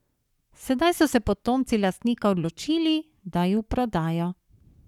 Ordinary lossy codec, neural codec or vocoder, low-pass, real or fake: none; codec, 44.1 kHz, 7.8 kbps, Pupu-Codec; 19.8 kHz; fake